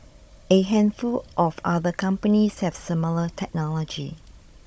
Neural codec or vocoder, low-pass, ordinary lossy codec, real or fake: codec, 16 kHz, 16 kbps, FunCodec, trained on Chinese and English, 50 frames a second; none; none; fake